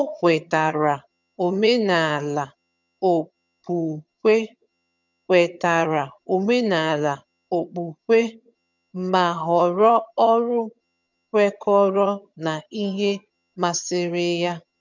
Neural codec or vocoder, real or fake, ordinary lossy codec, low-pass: vocoder, 22.05 kHz, 80 mel bands, HiFi-GAN; fake; none; 7.2 kHz